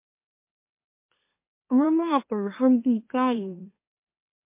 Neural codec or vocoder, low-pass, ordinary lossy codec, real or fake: autoencoder, 44.1 kHz, a latent of 192 numbers a frame, MeloTTS; 3.6 kHz; MP3, 24 kbps; fake